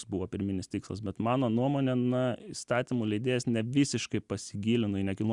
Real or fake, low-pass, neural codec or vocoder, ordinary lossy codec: real; 10.8 kHz; none; Opus, 64 kbps